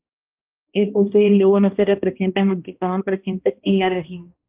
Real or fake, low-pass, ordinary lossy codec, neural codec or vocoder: fake; 3.6 kHz; Opus, 32 kbps; codec, 16 kHz, 1 kbps, X-Codec, HuBERT features, trained on balanced general audio